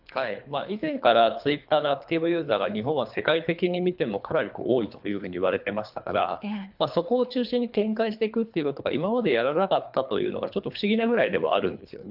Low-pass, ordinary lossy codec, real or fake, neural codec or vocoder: 5.4 kHz; none; fake; codec, 24 kHz, 3 kbps, HILCodec